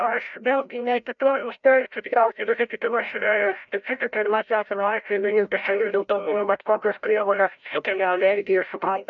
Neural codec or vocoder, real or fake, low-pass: codec, 16 kHz, 0.5 kbps, FreqCodec, larger model; fake; 7.2 kHz